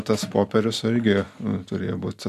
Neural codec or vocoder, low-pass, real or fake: none; 14.4 kHz; real